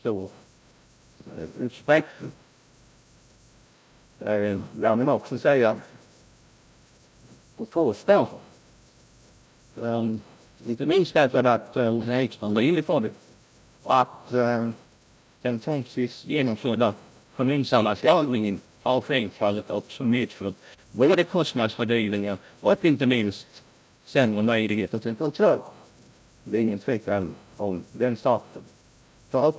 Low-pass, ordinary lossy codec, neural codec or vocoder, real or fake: none; none; codec, 16 kHz, 0.5 kbps, FreqCodec, larger model; fake